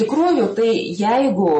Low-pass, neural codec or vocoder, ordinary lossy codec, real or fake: 10.8 kHz; none; MP3, 32 kbps; real